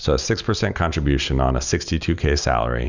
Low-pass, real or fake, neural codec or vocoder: 7.2 kHz; real; none